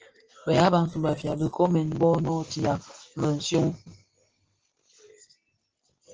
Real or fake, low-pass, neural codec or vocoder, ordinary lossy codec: real; 7.2 kHz; none; Opus, 16 kbps